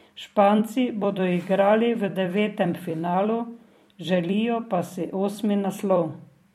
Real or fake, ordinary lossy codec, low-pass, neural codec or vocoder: fake; MP3, 64 kbps; 19.8 kHz; vocoder, 44.1 kHz, 128 mel bands every 256 samples, BigVGAN v2